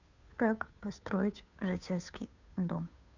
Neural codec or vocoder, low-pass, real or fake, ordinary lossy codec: codec, 16 kHz, 2 kbps, FunCodec, trained on Chinese and English, 25 frames a second; 7.2 kHz; fake; none